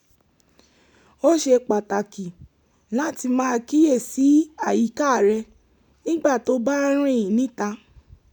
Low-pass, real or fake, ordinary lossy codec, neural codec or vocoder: 19.8 kHz; real; none; none